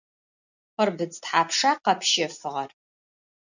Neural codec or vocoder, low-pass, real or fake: none; 7.2 kHz; real